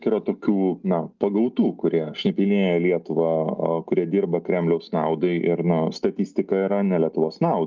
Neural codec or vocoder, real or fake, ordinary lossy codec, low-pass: none; real; Opus, 24 kbps; 7.2 kHz